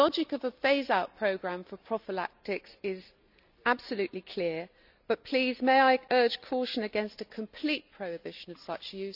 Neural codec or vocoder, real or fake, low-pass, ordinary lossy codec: none; real; 5.4 kHz; none